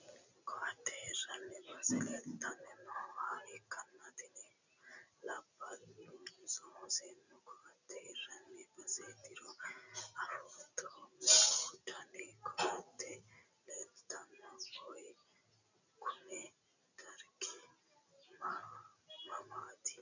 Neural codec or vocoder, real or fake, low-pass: none; real; 7.2 kHz